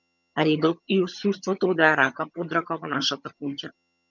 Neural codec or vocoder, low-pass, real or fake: vocoder, 22.05 kHz, 80 mel bands, HiFi-GAN; 7.2 kHz; fake